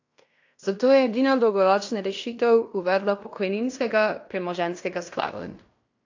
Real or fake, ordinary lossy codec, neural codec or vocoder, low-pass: fake; AAC, 48 kbps; codec, 16 kHz in and 24 kHz out, 0.9 kbps, LongCat-Audio-Codec, fine tuned four codebook decoder; 7.2 kHz